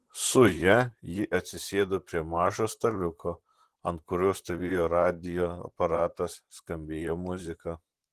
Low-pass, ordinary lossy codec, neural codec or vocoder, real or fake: 14.4 kHz; Opus, 16 kbps; vocoder, 44.1 kHz, 128 mel bands, Pupu-Vocoder; fake